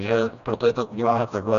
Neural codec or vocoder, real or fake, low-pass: codec, 16 kHz, 1 kbps, FreqCodec, smaller model; fake; 7.2 kHz